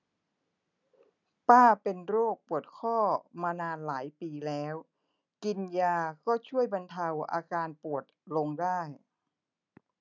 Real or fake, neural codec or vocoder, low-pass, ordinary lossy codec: real; none; 7.2 kHz; none